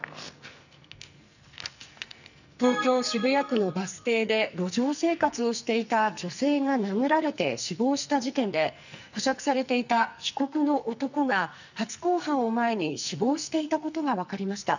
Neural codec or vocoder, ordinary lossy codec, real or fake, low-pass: codec, 44.1 kHz, 2.6 kbps, SNAC; none; fake; 7.2 kHz